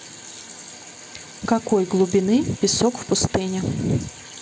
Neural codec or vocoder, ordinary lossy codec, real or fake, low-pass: none; none; real; none